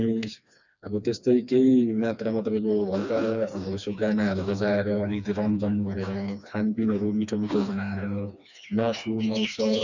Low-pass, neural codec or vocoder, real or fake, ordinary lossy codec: 7.2 kHz; codec, 16 kHz, 2 kbps, FreqCodec, smaller model; fake; none